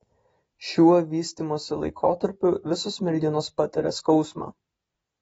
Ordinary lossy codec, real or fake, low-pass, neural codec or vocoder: AAC, 24 kbps; real; 19.8 kHz; none